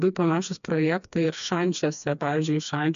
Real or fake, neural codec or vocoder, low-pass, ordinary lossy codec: fake; codec, 16 kHz, 2 kbps, FreqCodec, smaller model; 7.2 kHz; AAC, 96 kbps